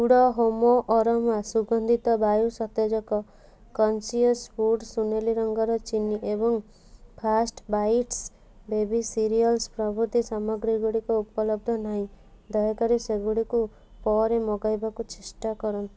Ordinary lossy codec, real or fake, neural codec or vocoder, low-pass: none; real; none; none